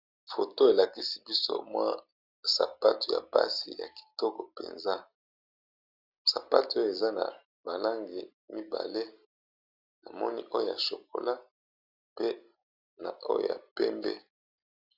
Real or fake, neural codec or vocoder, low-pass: real; none; 5.4 kHz